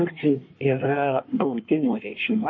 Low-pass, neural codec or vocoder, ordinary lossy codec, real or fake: 7.2 kHz; codec, 24 kHz, 1 kbps, SNAC; MP3, 32 kbps; fake